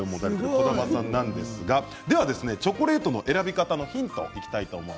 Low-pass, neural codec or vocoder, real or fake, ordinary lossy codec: none; none; real; none